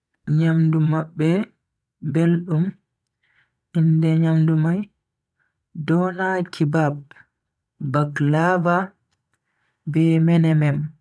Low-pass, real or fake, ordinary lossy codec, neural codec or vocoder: none; fake; none; vocoder, 22.05 kHz, 80 mel bands, Vocos